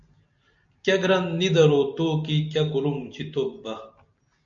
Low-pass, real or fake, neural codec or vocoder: 7.2 kHz; real; none